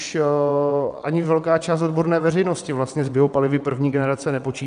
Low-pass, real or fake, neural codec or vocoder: 9.9 kHz; fake; vocoder, 22.05 kHz, 80 mel bands, WaveNeXt